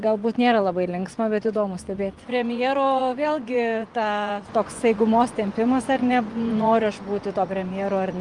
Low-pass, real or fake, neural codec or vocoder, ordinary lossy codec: 10.8 kHz; fake; vocoder, 24 kHz, 100 mel bands, Vocos; Opus, 32 kbps